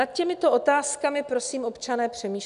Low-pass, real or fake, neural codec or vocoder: 10.8 kHz; real; none